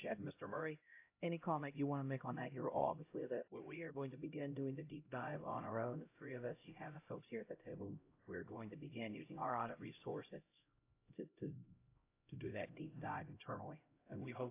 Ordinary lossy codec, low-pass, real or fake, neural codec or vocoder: AAC, 24 kbps; 3.6 kHz; fake; codec, 16 kHz, 0.5 kbps, X-Codec, HuBERT features, trained on LibriSpeech